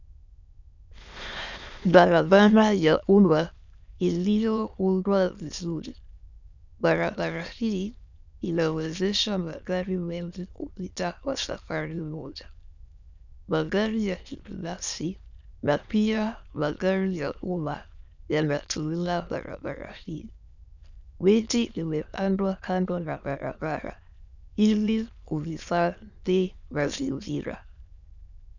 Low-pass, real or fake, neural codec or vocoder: 7.2 kHz; fake; autoencoder, 22.05 kHz, a latent of 192 numbers a frame, VITS, trained on many speakers